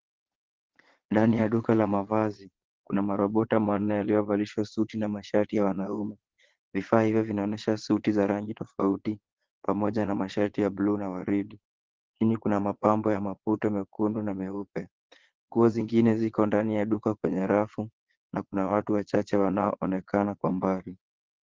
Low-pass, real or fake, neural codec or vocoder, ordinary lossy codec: 7.2 kHz; fake; vocoder, 22.05 kHz, 80 mel bands, Vocos; Opus, 16 kbps